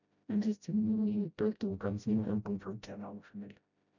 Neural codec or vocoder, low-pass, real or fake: codec, 16 kHz, 0.5 kbps, FreqCodec, smaller model; 7.2 kHz; fake